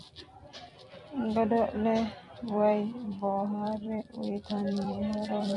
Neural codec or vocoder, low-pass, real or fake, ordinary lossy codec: vocoder, 44.1 kHz, 128 mel bands every 256 samples, BigVGAN v2; 10.8 kHz; fake; MP3, 64 kbps